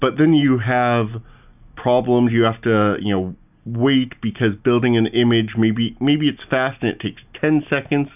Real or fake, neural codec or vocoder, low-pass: real; none; 3.6 kHz